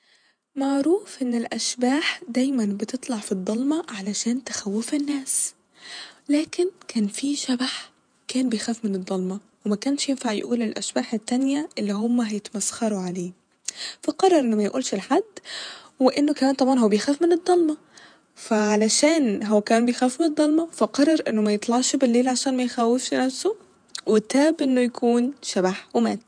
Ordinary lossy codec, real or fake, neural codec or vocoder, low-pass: none; fake; vocoder, 48 kHz, 128 mel bands, Vocos; 9.9 kHz